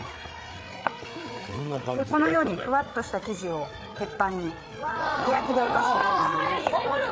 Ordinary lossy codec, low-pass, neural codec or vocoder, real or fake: none; none; codec, 16 kHz, 8 kbps, FreqCodec, larger model; fake